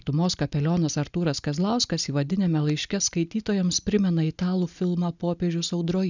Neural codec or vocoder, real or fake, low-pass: none; real; 7.2 kHz